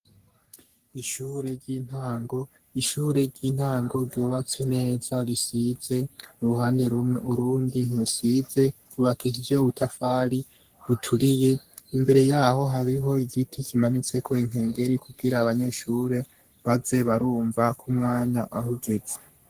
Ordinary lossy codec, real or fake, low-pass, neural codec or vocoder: Opus, 24 kbps; fake; 14.4 kHz; codec, 44.1 kHz, 3.4 kbps, Pupu-Codec